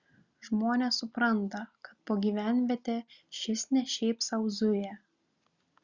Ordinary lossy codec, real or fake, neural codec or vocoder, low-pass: Opus, 64 kbps; real; none; 7.2 kHz